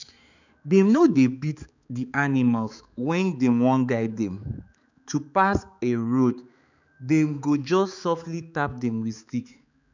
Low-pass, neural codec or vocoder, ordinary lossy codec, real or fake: 7.2 kHz; codec, 16 kHz, 4 kbps, X-Codec, HuBERT features, trained on balanced general audio; none; fake